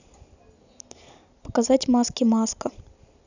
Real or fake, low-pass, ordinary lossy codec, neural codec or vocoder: real; 7.2 kHz; none; none